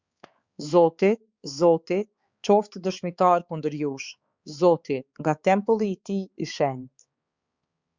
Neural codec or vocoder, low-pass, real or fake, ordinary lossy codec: codec, 16 kHz, 4 kbps, X-Codec, HuBERT features, trained on balanced general audio; 7.2 kHz; fake; Opus, 64 kbps